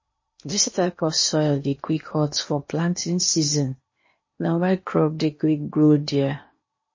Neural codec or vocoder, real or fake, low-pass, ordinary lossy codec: codec, 16 kHz in and 24 kHz out, 0.8 kbps, FocalCodec, streaming, 65536 codes; fake; 7.2 kHz; MP3, 32 kbps